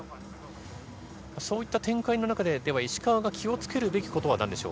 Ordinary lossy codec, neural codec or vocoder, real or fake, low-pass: none; none; real; none